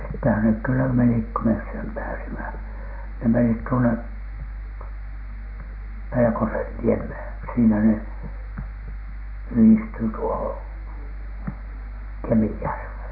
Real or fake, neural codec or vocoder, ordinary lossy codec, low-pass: real; none; none; 5.4 kHz